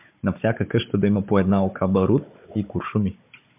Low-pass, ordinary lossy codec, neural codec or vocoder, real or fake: 3.6 kHz; MP3, 32 kbps; codec, 16 kHz, 16 kbps, FunCodec, trained on Chinese and English, 50 frames a second; fake